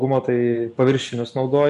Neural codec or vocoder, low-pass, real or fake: none; 10.8 kHz; real